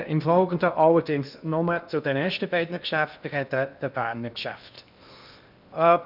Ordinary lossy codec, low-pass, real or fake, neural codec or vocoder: none; 5.4 kHz; fake; codec, 16 kHz in and 24 kHz out, 0.8 kbps, FocalCodec, streaming, 65536 codes